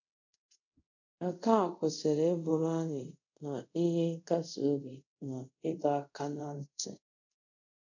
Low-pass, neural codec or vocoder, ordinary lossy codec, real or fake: 7.2 kHz; codec, 24 kHz, 0.5 kbps, DualCodec; none; fake